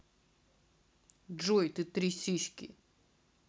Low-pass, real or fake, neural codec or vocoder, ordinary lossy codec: none; real; none; none